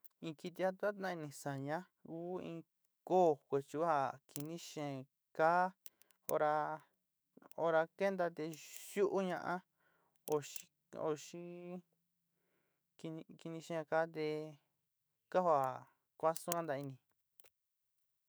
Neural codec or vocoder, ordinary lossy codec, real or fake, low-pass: autoencoder, 48 kHz, 128 numbers a frame, DAC-VAE, trained on Japanese speech; none; fake; none